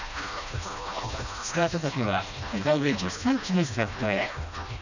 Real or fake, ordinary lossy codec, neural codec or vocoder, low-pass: fake; none; codec, 16 kHz, 1 kbps, FreqCodec, smaller model; 7.2 kHz